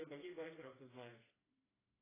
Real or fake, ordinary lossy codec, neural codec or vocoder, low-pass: fake; AAC, 16 kbps; codec, 16 kHz, 2 kbps, FreqCodec, smaller model; 3.6 kHz